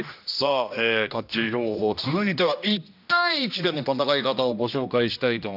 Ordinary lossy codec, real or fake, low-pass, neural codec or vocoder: none; fake; 5.4 kHz; codec, 16 kHz, 1 kbps, X-Codec, HuBERT features, trained on general audio